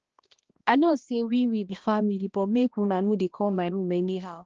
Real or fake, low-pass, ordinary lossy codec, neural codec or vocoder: fake; 7.2 kHz; Opus, 16 kbps; codec, 16 kHz, 1 kbps, X-Codec, HuBERT features, trained on balanced general audio